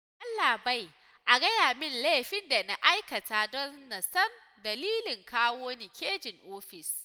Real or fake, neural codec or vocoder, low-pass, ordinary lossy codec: real; none; none; none